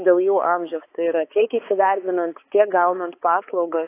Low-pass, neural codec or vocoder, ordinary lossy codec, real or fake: 3.6 kHz; codec, 16 kHz, 4 kbps, X-Codec, HuBERT features, trained on balanced general audio; AAC, 24 kbps; fake